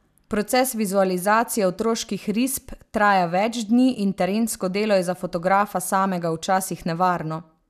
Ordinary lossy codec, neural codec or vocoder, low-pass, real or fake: none; none; 14.4 kHz; real